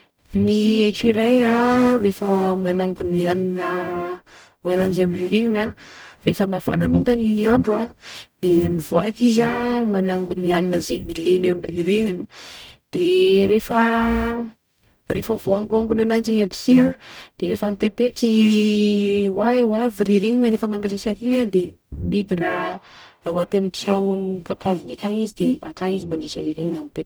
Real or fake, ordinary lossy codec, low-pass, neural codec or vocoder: fake; none; none; codec, 44.1 kHz, 0.9 kbps, DAC